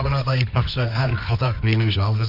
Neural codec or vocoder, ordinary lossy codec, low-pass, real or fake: codec, 24 kHz, 0.9 kbps, WavTokenizer, medium music audio release; none; 5.4 kHz; fake